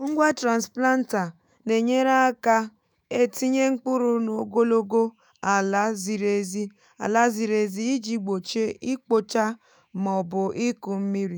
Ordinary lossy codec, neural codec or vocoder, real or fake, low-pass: none; autoencoder, 48 kHz, 128 numbers a frame, DAC-VAE, trained on Japanese speech; fake; none